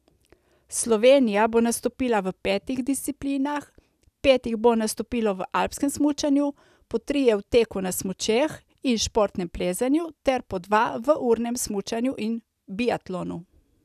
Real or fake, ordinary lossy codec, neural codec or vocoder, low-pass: real; none; none; 14.4 kHz